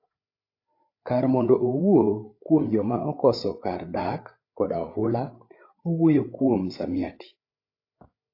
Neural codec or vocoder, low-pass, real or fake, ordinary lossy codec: codec, 16 kHz, 8 kbps, FreqCodec, larger model; 5.4 kHz; fake; AAC, 32 kbps